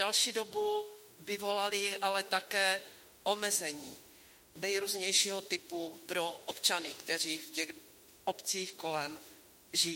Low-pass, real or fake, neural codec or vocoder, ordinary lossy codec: 19.8 kHz; fake; autoencoder, 48 kHz, 32 numbers a frame, DAC-VAE, trained on Japanese speech; MP3, 64 kbps